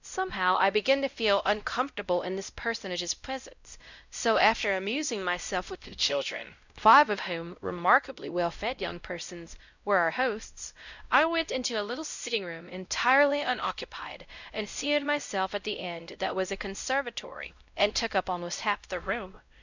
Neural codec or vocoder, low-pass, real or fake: codec, 16 kHz, 0.5 kbps, X-Codec, WavLM features, trained on Multilingual LibriSpeech; 7.2 kHz; fake